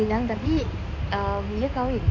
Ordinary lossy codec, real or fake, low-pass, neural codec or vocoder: none; fake; 7.2 kHz; codec, 16 kHz in and 24 kHz out, 2.2 kbps, FireRedTTS-2 codec